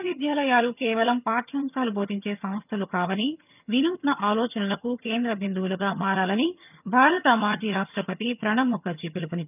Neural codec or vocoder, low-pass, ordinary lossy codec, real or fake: vocoder, 22.05 kHz, 80 mel bands, HiFi-GAN; 3.6 kHz; none; fake